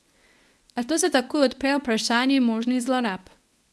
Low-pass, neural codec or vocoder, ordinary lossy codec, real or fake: none; codec, 24 kHz, 0.9 kbps, WavTokenizer, medium speech release version 1; none; fake